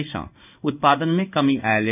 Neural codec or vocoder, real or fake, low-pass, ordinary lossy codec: codec, 16 kHz in and 24 kHz out, 1 kbps, XY-Tokenizer; fake; 3.6 kHz; none